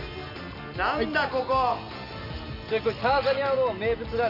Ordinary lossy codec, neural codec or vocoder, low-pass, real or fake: none; none; 5.4 kHz; real